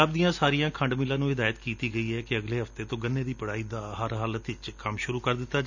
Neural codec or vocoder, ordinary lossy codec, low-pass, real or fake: none; none; 7.2 kHz; real